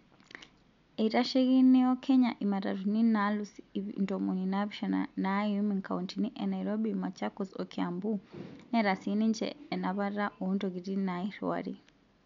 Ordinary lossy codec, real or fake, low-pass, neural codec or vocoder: MP3, 64 kbps; real; 7.2 kHz; none